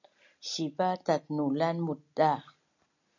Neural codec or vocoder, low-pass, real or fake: none; 7.2 kHz; real